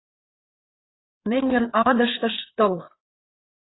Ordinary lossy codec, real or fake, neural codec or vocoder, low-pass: AAC, 16 kbps; fake; codec, 16 kHz, 4 kbps, FreqCodec, larger model; 7.2 kHz